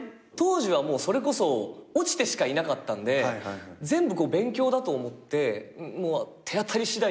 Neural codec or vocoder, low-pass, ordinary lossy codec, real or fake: none; none; none; real